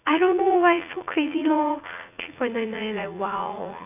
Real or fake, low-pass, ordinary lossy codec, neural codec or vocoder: fake; 3.6 kHz; none; vocoder, 44.1 kHz, 80 mel bands, Vocos